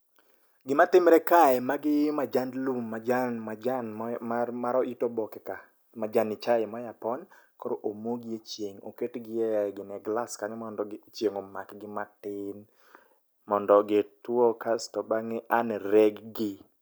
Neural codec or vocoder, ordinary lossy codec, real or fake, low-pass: none; none; real; none